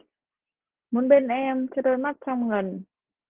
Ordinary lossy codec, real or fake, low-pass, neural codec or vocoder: Opus, 16 kbps; real; 3.6 kHz; none